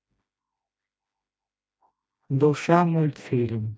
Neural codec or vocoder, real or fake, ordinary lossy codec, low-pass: codec, 16 kHz, 1 kbps, FreqCodec, smaller model; fake; none; none